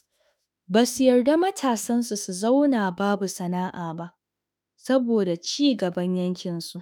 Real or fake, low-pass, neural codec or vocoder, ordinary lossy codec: fake; none; autoencoder, 48 kHz, 32 numbers a frame, DAC-VAE, trained on Japanese speech; none